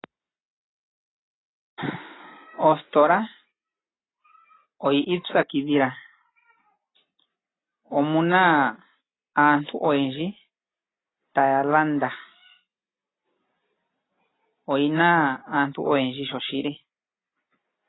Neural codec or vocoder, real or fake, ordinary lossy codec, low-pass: none; real; AAC, 16 kbps; 7.2 kHz